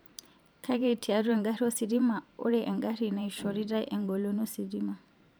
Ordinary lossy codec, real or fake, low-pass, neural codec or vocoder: none; fake; none; vocoder, 44.1 kHz, 128 mel bands every 512 samples, BigVGAN v2